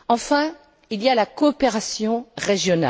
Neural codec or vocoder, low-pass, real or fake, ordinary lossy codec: none; none; real; none